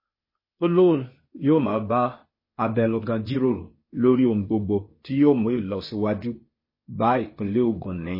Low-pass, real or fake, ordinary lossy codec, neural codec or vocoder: 5.4 kHz; fake; MP3, 24 kbps; codec, 16 kHz, 0.8 kbps, ZipCodec